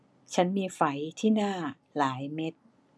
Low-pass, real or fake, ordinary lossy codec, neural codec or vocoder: none; real; none; none